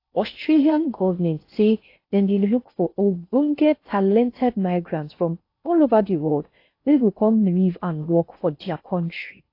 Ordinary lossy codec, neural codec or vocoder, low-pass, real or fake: AAC, 32 kbps; codec, 16 kHz in and 24 kHz out, 0.6 kbps, FocalCodec, streaming, 4096 codes; 5.4 kHz; fake